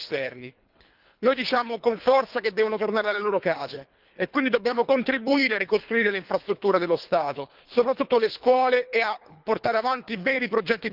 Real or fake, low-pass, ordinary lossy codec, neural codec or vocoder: fake; 5.4 kHz; Opus, 24 kbps; codec, 24 kHz, 3 kbps, HILCodec